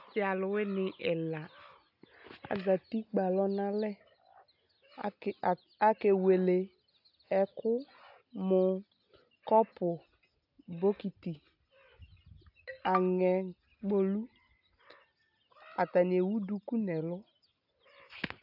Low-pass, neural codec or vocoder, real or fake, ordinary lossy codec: 5.4 kHz; none; real; AAC, 48 kbps